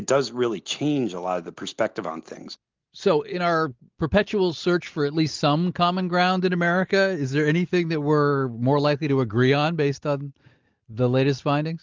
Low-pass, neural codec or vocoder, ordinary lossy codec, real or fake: 7.2 kHz; none; Opus, 32 kbps; real